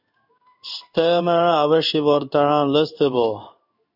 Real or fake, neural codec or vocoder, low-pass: fake; codec, 16 kHz in and 24 kHz out, 1 kbps, XY-Tokenizer; 5.4 kHz